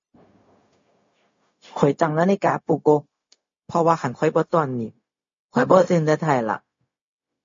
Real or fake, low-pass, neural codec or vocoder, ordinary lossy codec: fake; 7.2 kHz; codec, 16 kHz, 0.4 kbps, LongCat-Audio-Codec; MP3, 32 kbps